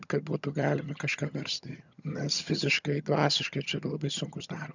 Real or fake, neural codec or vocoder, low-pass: fake; vocoder, 22.05 kHz, 80 mel bands, HiFi-GAN; 7.2 kHz